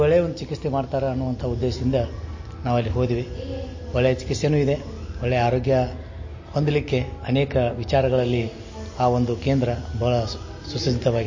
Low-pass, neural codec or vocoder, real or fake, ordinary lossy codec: 7.2 kHz; none; real; MP3, 32 kbps